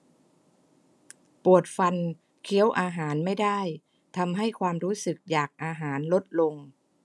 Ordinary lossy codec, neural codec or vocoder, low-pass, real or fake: none; none; none; real